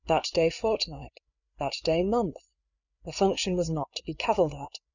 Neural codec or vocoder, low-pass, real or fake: codec, 16 kHz, 8 kbps, FreqCodec, larger model; 7.2 kHz; fake